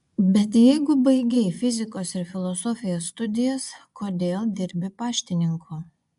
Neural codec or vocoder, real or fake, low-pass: vocoder, 24 kHz, 100 mel bands, Vocos; fake; 10.8 kHz